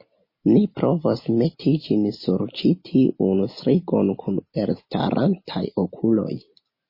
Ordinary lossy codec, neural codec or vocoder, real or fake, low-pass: MP3, 24 kbps; none; real; 5.4 kHz